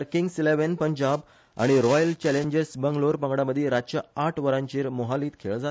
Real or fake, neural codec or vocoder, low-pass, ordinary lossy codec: real; none; none; none